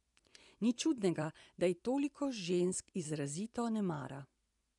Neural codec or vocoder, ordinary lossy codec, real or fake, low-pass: vocoder, 24 kHz, 100 mel bands, Vocos; none; fake; 10.8 kHz